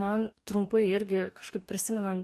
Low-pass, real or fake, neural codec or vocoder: 14.4 kHz; fake; codec, 44.1 kHz, 2.6 kbps, DAC